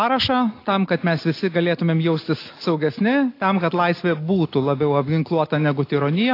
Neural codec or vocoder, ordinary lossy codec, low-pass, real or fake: none; AAC, 32 kbps; 5.4 kHz; real